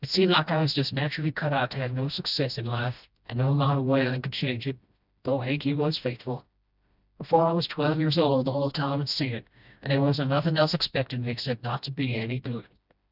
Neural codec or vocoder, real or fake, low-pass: codec, 16 kHz, 1 kbps, FreqCodec, smaller model; fake; 5.4 kHz